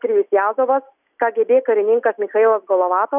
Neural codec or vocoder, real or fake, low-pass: none; real; 3.6 kHz